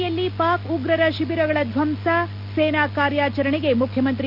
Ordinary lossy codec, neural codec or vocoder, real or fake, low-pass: none; none; real; 5.4 kHz